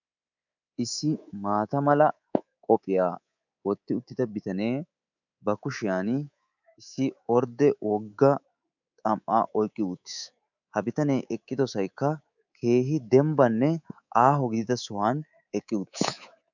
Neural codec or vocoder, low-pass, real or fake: codec, 24 kHz, 3.1 kbps, DualCodec; 7.2 kHz; fake